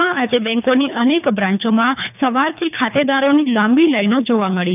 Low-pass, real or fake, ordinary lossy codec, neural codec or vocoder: 3.6 kHz; fake; none; codec, 24 kHz, 3 kbps, HILCodec